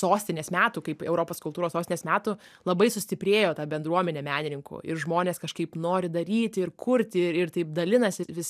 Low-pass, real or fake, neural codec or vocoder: 14.4 kHz; real; none